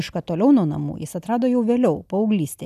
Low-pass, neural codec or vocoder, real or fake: 14.4 kHz; none; real